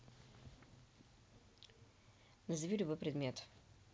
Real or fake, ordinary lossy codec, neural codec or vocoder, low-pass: real; none; none; none